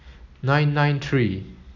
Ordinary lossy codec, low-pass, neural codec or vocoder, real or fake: none; 7.2 kHz; none; real